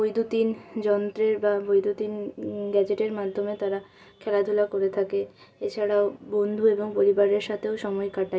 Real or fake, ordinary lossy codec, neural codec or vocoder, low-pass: real; none; none; none